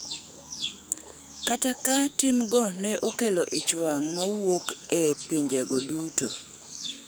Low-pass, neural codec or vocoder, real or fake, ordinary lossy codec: none; codec, 44.1 kHz, 2.6 kbps, SNAC; fake; none